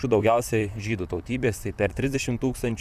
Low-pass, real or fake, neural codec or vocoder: 14.4 kHz; fake; vocoder, 44.1 kHz, 128 mel bands, Pupu-Vocoder